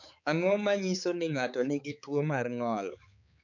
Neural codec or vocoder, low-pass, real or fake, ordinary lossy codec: codec, 16 kHz, 4 kbps, X-Codec, HuBERT features, trained on balanced general audio; 7.2 kHz; fake; none